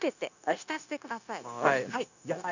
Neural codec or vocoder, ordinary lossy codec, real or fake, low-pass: codec, 16 kHz, 0.9 kbps, LongCat-Audio-Codec; none; fake; 7.2 kHz